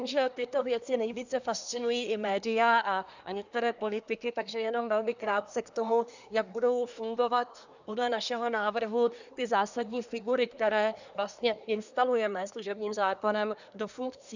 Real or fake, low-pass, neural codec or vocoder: fake; 7.2 kHz; codec, 24 kHz, 1 kbps, SNAC